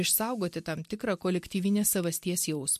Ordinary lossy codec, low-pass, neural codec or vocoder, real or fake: MP3, 64 kbps; 14.4 kHz; none; real